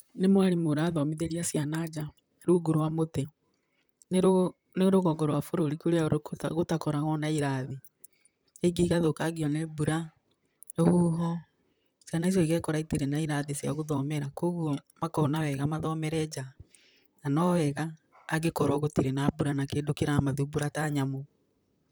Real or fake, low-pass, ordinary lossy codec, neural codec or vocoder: fake; none; none; vocoder, 44.1 kHz, 128 mel bands, Pupu-Vocoder